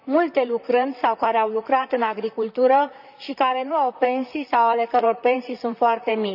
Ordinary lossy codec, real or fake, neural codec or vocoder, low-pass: none; fake; vocoder, 44.1 kHz, 128 mel bands, Pupu-Vocoder; 5.4 kHz